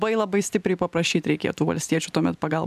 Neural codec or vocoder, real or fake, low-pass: none; real; 14.4 kHz